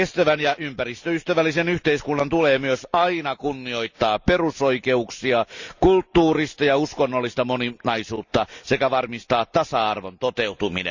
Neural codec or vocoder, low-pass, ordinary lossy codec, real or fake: none; 7.2 kHz; Opus, 64 kbps; real